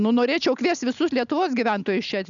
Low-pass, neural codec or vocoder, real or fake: 7.2 kHz; none; real